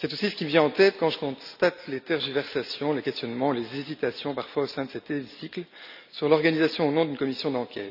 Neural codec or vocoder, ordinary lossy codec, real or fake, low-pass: none; none; real; 5.4 kHz